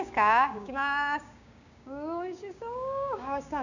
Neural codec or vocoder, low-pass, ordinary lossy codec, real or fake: codec, 16 kHz, 6 kbps, DAC; 7.2 kHz; none; fake